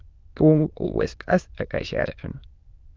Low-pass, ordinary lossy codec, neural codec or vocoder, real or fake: 7.2 kHz; Opus, 24 kbps; autoencoder, 22.05 kHz, a latent of 192 numbers a frame, VITS, trained on many speakers; fake